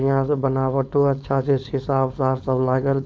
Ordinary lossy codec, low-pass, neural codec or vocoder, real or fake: none; none; codec, 16 kHz, 4.8 kbps, FACodec; fake